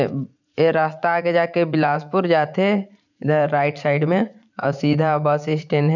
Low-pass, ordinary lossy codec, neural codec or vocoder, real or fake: 7.2 kHz; none; vocoder, 44.1 kHz, 80 mel bands, Vocos; fake